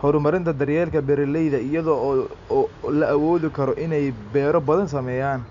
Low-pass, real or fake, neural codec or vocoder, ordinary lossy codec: 7.2 kHz; real; none; none